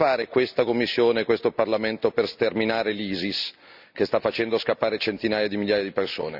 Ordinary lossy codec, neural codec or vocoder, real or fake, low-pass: none; none; real; 5.4 kHz